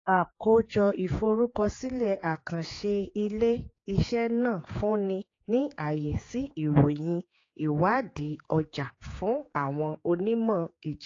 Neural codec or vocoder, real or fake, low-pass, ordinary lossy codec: codec, 16 kHz, 4 kbps, X-Codec, HuBERT features, trained on general audio; fake; 7.2 kHz; AAC, 32 kbps